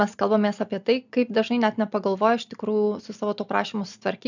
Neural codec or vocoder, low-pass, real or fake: none; 7.2 kHz; real